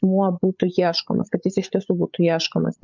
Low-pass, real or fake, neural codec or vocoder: 7.2 kHz; fake; codec, 16 kHz, 8 kbps, FreqCodec, larger model